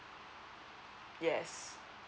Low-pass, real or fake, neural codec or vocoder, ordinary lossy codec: none; real; none; none